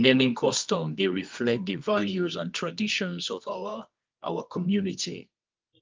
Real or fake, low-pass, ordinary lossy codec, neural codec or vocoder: fake; 7.2 kHz; Opus, 24 kbps; codec, 24 kHz, 0.9 kbps, WavTokenizer, medium music audio release